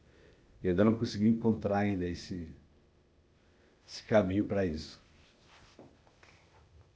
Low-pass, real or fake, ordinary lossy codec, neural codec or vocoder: none; fake; none; codec, 16 kHz, 0.8 kbps, ZipCodec